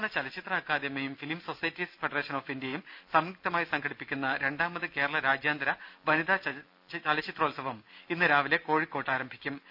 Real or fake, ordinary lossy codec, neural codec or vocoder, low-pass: real; none; none; 5.4 kHz